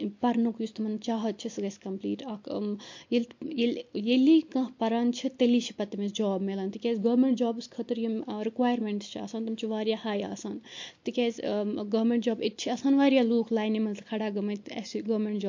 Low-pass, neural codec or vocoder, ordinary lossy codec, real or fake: 7.2 kHz; none; MP3, 48 kbps; real